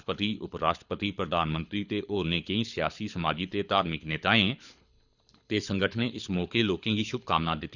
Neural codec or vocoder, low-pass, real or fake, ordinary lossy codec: codec, 24 kHz, 6 kbps, HILCodec; 7.2 kHz; fake; none